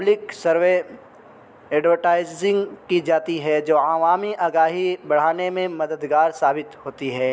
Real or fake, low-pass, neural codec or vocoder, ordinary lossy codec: real; none; none; none